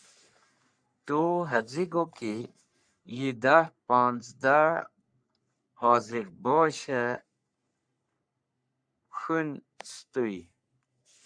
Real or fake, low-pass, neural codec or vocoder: fake; 9.9 kHz; codec, 44.1 kHz, 3.4 kbps, Pupu-Codec